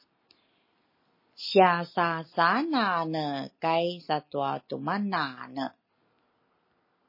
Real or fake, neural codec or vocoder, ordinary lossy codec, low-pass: real; none; MP3, 24 kbps; 5.4 kHz